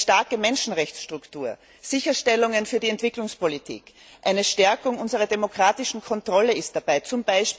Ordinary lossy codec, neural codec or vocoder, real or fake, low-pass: none; none; real; none